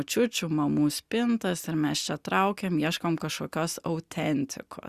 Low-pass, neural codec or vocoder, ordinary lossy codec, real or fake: 14.4 kHz; none; Opus, 64 kbps; real